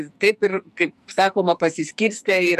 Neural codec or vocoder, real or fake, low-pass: codec, 44.1 kHz, 2.6 kbps, SNAC; fake; 14.4 kHz